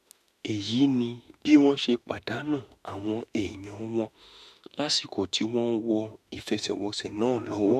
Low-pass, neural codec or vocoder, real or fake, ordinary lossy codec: 14.4 kHz; autoencoder, 48 kHz, 32 numbers a frame, DAC-VAE, trained on Japanese speech; fake; none